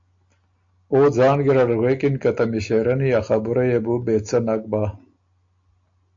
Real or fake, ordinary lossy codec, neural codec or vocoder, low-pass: real; AAC, 64 kbps; none; 7.2 kHz